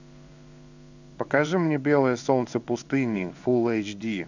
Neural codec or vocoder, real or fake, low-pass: codec, 16 kHz in and 24 kHz out, 1 kbps, XY-Tokenizer; fake; 7.2 kHz